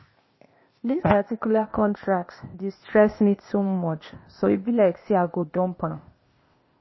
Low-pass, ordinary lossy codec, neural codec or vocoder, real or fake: 7.2 kHz; MP3, 24 kbps; codec, 16 kHz, 0.8 kbps, ZipCodec; fake